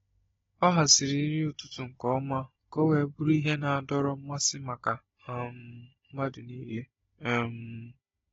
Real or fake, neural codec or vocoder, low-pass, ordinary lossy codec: fake; codec, 16 kHz, 16 kbps, FunCodec, trained on Chinese and English, 50 frames a second; 7.2 kHz; AAC, 24 kbps